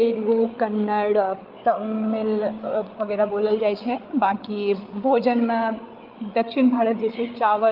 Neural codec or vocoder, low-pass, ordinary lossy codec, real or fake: codec, 16 kHz, 8 kbps, FreqCodec, larger model; 5.4 kHz; Opus, 32 kbps; fake